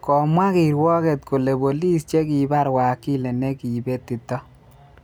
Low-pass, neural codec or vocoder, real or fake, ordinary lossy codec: none; none; real; none